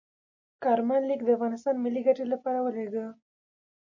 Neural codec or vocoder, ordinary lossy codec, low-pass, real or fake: none; MP3, 32 kbps; 7.2 kHz; real